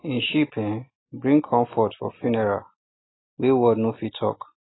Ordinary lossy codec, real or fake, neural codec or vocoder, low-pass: AAC, 16 kbps; real; none; 7.2 kHz